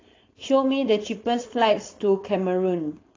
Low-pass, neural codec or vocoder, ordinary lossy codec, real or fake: 7.2 kHz; codec, 16 kHz, 4.8 kbps, FACodec; AAC, 32 kbps; fake